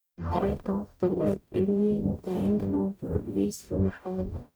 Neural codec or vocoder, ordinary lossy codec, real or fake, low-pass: codec, 44.1 kHz, 0.9 kbps, DAC; none; fake; none